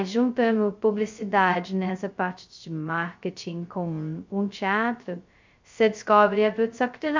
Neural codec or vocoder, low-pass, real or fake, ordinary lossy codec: codec, 16 kHz, 0.2 kbps, FocalCodec; 7.2 kHz; fake; MP3, 64 kbps